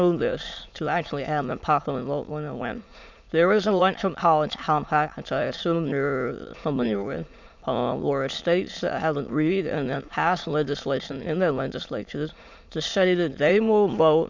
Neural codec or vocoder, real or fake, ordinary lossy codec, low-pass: autoencoder, 22.05 kHz, a latent of 192 numbers a frame, VITS, trained on many speakers; fake; MP3, 64 kbps; 7.2 kHz